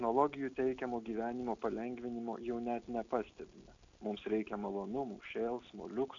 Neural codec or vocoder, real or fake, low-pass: none; real; 7.2 kHz